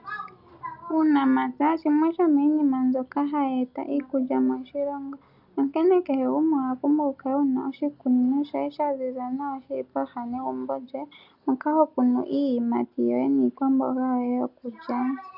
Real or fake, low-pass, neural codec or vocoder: real; 5.4 kHz; none